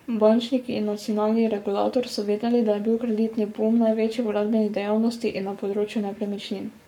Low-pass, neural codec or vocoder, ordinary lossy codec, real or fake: 19.8 kHz; codec, 44.1 kHz, 7.8 kbps, Pupu-Codec; none; fake